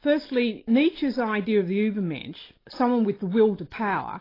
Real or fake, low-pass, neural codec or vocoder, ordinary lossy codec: real; 5.4 kHz; none; AAC, 24 kbps